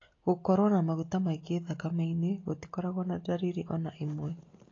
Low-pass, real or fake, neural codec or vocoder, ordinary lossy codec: 7.2 kHz; real; none; AAC, 32 kbps